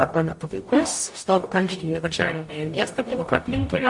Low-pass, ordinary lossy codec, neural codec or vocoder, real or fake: 10.8 kHz; MP3, 48 kbps; codec, 44.1 kHz, 0.9 kbps, DAC; fake